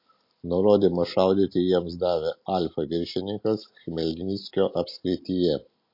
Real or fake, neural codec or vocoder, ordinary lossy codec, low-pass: real; none; MP3, 32 kbps; 5.4 kHz